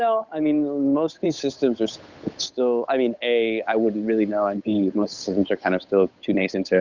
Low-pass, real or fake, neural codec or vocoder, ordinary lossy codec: 7.2 kHz; fake; codec, 16 kHz, 8 kbps, FunCodec, trained on Chinese and English, 25 frames a second; Opus, 64 kbps